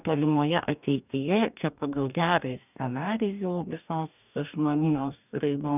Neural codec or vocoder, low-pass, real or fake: codec, 44.1 kHz, 2.6 kbps, DAC; 3.6 kHz; fake